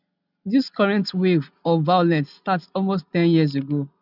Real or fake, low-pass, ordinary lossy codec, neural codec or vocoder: real; 5.4 kHz; none; none